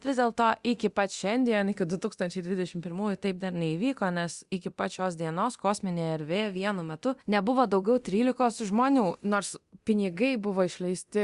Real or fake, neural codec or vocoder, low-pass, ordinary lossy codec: fake; codec, 24 kHz, 0.9 kbps, DualCodec; 10.8 kHz; Opus, 64 kbps